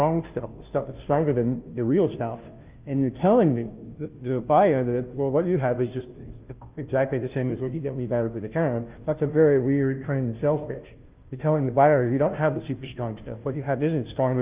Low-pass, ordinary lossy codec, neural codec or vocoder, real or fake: 3.6 kHz; Opus, 32 kbps; codec, 16 kHz, 0.5 kbps, FunCodec, trained on Chinese and English, 25 frames a second; fake